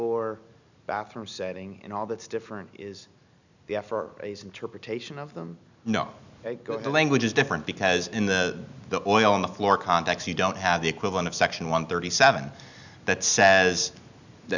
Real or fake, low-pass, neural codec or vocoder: real; 7.2 kHz; none